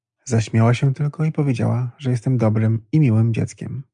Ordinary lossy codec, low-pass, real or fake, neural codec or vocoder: MP3, 96 kbps; 9.9 kHz; real; none